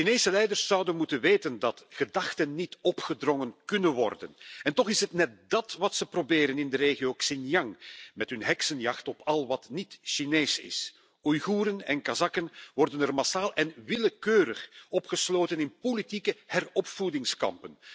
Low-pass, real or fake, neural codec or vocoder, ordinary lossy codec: none; real; none; none